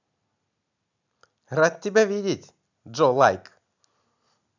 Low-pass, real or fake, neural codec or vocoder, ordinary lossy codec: 7.2 kHz; real; none; none